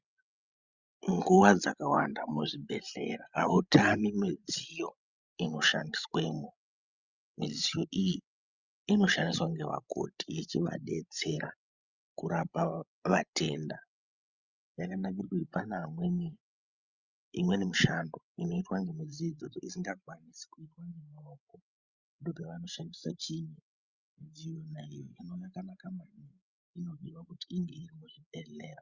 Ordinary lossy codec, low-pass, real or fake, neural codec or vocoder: Opus, 64 kbps; 7.2 kHz; fake; codec, 16 kHz, 16 kbps, FreqCodec, larger model